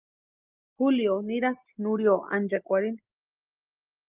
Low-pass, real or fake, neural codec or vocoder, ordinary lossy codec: 3.6 kHz; real; none; Opus, 32 kbps